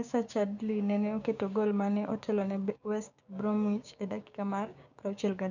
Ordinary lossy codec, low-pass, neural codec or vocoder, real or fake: none; 7.2 kHz; codec, 16 kHz, 6 kbps, DAC; fake